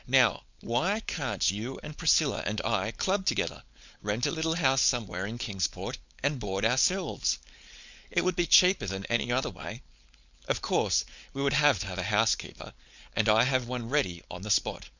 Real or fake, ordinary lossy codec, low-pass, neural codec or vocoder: fake; Opus, 64 kbps; 7.2 kHz; codec, 16 kHz, 4.8 kbps, FACodec